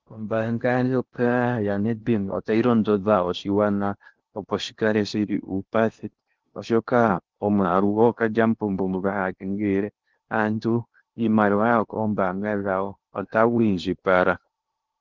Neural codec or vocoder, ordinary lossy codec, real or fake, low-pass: codec, 16 kHz in and 24 kHz out, 0.8 kbps, FocalCodec, streaming, 65536 codes; Opus, 32 kbps; fake; 7.2 kHz